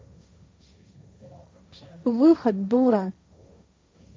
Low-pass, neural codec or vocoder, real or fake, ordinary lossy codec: none; codec, 16 kHz, 1.1 kbps, Voila-Tokenizer; fake; none